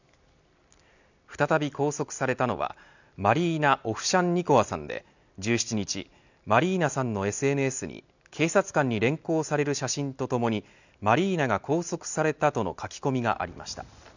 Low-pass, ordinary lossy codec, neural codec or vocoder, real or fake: 7.2 kHz; none; none; real